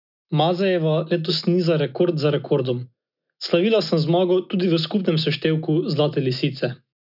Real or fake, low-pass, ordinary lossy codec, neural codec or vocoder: real; 5.4 kHz; none; none